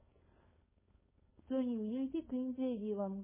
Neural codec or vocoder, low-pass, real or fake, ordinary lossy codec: codec, 16 kHz, 4.8 kbps, FACodec; 3.6 kHz; fake; MP3, 16 kbps